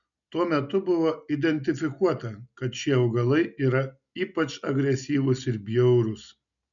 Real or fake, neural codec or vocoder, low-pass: real; none; 7.2 kHz